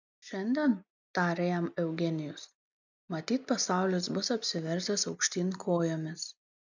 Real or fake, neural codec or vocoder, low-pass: real; none; 7.2 kHz